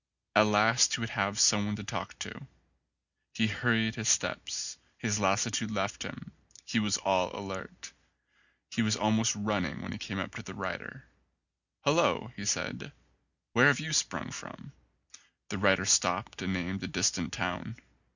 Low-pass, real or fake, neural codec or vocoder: 7.2 kHz; real; none